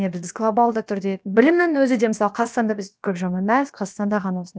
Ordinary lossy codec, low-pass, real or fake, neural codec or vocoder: none; none; fake; codec, 16 kHz, about 1 kbps, DyCAST, with the encoder's durations